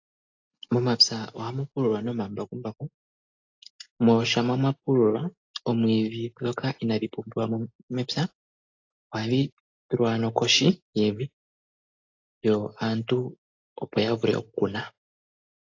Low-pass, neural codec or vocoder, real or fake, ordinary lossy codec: 7.2 kHz; none; real; AAC, 48 kbps